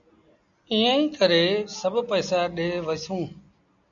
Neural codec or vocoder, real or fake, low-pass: none; real; 7.2 kHz